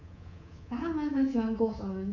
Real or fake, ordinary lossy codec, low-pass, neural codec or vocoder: fake; none; 7.2 kHz; codec, 24 kHz, 3.1 kbps, DualCodec